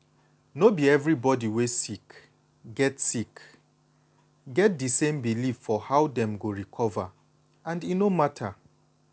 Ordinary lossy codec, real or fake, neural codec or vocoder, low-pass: none; real; none; none